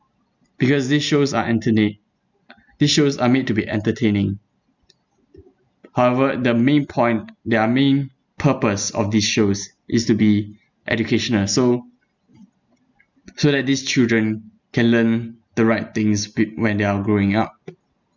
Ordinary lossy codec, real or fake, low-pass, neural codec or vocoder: none; real; 7.2 kHz; none